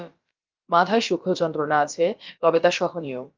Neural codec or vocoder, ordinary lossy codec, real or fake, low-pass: codec, 16 kHz, about 1 kbps, DyCAST, with the encoder's durations; Opus, 24 kbps; fake; 7.2 kHz